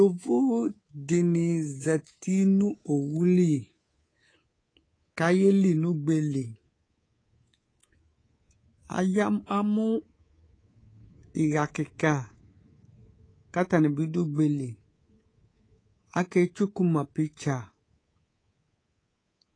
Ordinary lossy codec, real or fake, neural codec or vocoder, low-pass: AAC, 32 kbps; fake; codec, 24 kHz, 3.1 kbps, DualCodec; 9.9 kHz